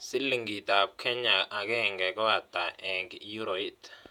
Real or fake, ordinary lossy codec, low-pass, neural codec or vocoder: fake; none; 19.8 kHz; vocoder, 48 kHz, 128 mel bands, Vocos